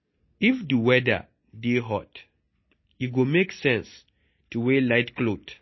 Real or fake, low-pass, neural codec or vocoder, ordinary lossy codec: real; 7.2 kHz; none; MP3, 24 kbps